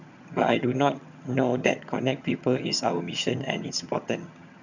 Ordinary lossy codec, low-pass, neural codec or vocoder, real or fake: none; 7.2 kHz; vocoder, 22.05 kHz, 80 mel bands, HiFi-GAN; fake